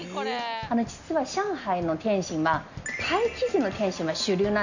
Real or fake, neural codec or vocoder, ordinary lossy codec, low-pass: real; none; none; 7.2 kHz